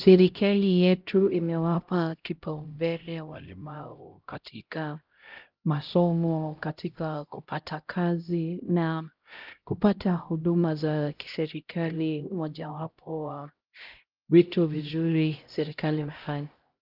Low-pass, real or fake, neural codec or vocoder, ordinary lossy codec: 5.4 kHz; fake; codec, 16 kHz, 0.5 kbps, X-Codec, HuBERT features, trained on LibriSpeech; Opus, 24 kbps